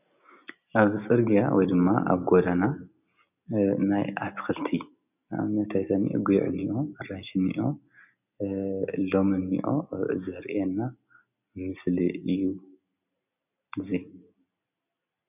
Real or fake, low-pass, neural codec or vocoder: real; 3.6 kHz; none